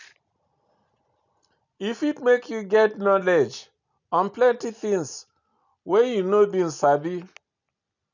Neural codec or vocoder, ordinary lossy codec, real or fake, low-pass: none; none; real; 7.2 kHz